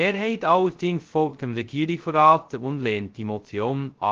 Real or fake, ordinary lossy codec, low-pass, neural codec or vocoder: fake; Opus, 16 kbps; 7.2 kHz; codec, 16 kHz, 0.2 kbps, FocalCodec